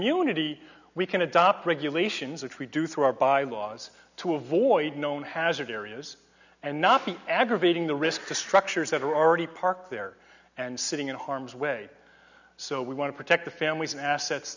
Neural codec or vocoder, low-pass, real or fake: none; 7.2 kHz; real